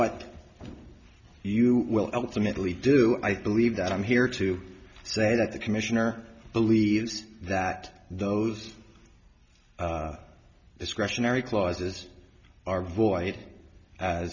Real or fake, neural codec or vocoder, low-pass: real; none; 7.2 kHz